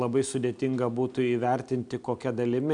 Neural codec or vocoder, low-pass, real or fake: none; 9.9 kHz; real